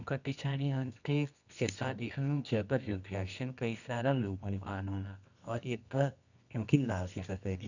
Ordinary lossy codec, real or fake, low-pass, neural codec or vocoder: none; fake; 7.2 kHz; codec, 24 kHz, 0.9 kbps, WavTokenizer, medium music audio release